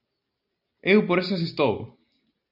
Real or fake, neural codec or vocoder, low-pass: real; none; 5.4 kHz